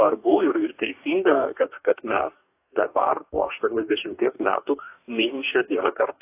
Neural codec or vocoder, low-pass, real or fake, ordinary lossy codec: codec, 44.1 kHz, 2.6 kbps, DAC; 3.6 kHz; fake; AAC, 24 kbps